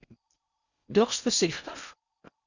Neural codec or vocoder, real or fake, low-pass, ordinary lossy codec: codec, 16 kHz in and 24 kHz out, 0.6 kbps, FocalCodec, streaming, 4096 codes; fake; 7.2 kHz; Opus, 64 kbps